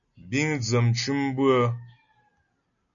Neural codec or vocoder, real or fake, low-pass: none; real; 7.2 kHz